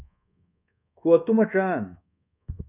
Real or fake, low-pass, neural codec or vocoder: fake; 3.6 kHz; codec, 24 kHz, 1.2 kbps, DualCodec